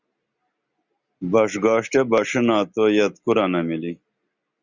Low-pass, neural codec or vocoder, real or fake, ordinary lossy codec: 7.2 kHz; none; real; Opus, 64 kbps